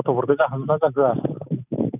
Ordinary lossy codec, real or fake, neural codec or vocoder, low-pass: none; real; none; 3.6 kHz